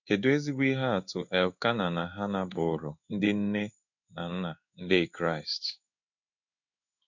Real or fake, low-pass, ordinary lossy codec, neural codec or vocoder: fake; 7.2 kHz; none; codec, 16 kHz in and 24 kHz out, 1 kbps, XY-Tokenizer